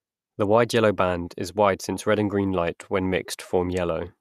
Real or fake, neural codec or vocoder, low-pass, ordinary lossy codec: fake; vocoder, 48 kHz, 128 mel bands, Vocos; 14.4 kHz; none